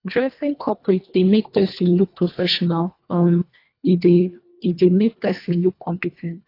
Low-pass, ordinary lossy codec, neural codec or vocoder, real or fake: 5.4 kHz; AAC, 32 kbps; codec, 24 kHz, 1.5 kbps, HILCodec; fake